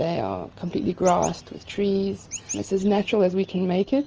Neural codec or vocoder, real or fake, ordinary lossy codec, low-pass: none; real; Opus, 24 kbps; 7.2 kHz